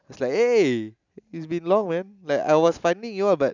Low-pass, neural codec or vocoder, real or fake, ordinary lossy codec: 7.2 kHz; none; real; none